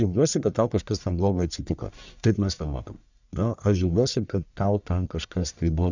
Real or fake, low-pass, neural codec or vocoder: fake; 7.2 kHz; codec, 44.1 kHz, 1.7 kbps, Pupu-Codec